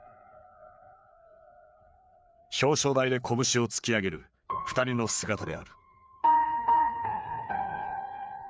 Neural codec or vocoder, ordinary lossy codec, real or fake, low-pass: codec, 16 kHz, 4 kbps, FreqCodec, larger model; none; fake; none